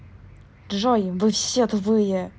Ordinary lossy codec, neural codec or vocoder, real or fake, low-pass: none; none; real; none